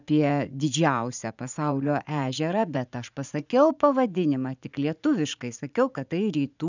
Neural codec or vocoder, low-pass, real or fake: vocoder, 44.1 kHz, 80 mel bands, Vocos; 7.2 kHz; fake